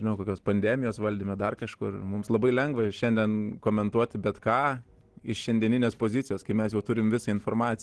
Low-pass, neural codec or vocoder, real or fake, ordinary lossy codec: 10.8 kHz; none; real; Opus, 16 kbps